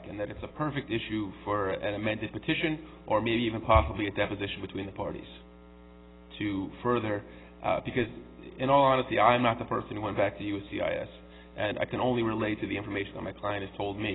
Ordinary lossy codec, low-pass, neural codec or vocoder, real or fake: AAC, 16 kbps; 7.2 kHz; none; real